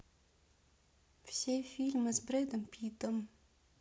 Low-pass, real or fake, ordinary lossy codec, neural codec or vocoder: none; real; none; none